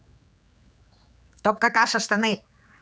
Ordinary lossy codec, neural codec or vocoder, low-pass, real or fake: none; codec, 16 kHz, 4 kbps, X-Codec, HuBERT features, trained on general audio; none; fake